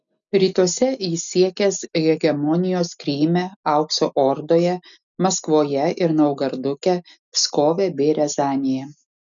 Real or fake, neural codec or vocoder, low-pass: real; none; 7.2 kHz